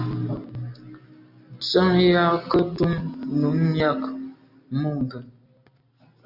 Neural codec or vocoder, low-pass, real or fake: none; 5.4 kHz; real